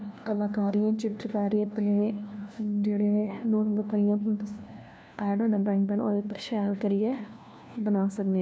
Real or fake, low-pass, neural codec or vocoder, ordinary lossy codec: fake; none; codec, 16 kHz, 1 kbps, FunCodec, trained on LibriTTS, 50 frames a second; none